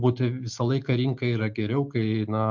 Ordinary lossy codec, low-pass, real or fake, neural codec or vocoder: MP3, 64 kbps; 7.2 kHz; real; none